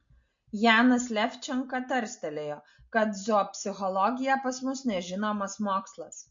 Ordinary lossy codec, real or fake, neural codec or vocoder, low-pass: MP3, 48 kbps; real; none; 7.2 kHz